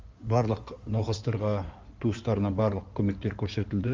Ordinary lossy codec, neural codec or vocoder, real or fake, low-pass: Opus, 32 kbps; codec, 16 kHz in and 24 kHz out, 2.2 kbps, FireRedTTS-2 codec; fake; 7.2 kHz